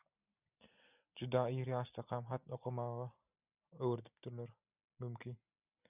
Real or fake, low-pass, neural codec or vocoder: real; 3.6 kHz; none